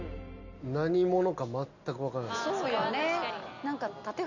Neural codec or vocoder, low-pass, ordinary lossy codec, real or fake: none; 7.2 kHz; none; real